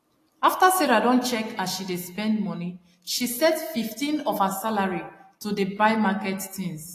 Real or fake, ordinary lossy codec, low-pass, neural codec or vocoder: real; AAC, 48 kbps; 14.4 kHz; none